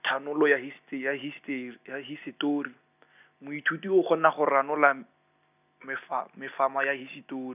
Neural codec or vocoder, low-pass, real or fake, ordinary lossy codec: none; 3.6 kHz; real; none